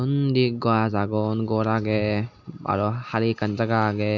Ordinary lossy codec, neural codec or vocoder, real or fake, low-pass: MP3, 64 kbps; none; real; 7.2 kHz